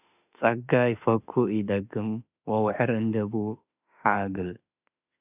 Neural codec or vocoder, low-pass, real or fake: autoencoder, 48 kHz, 32 numbers a frame, DAC-VAE, trained on Japanese speech; 3.6 kHz; fake